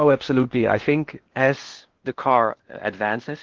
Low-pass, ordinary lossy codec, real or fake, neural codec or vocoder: 7.2 kHz; Opus, 16 kbps; fake; codec, 16 kHz in and 24 kHz out, 0.8 kbps, FocalCodec, streaming, 65536 codes